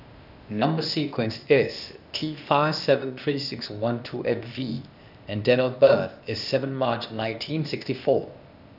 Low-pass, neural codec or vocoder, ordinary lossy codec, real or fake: 5.4 kHz; codec, 16 kHz, 0.8 kbps, ZipCodec; none; fake